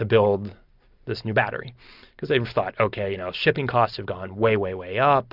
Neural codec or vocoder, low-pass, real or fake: none; 5.4 kHz; real